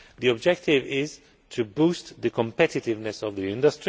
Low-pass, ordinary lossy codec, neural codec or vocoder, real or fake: none; none; none; real